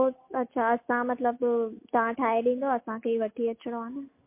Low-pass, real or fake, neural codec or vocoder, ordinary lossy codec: 3.6 kHz; real; none; MP3, 24 kbps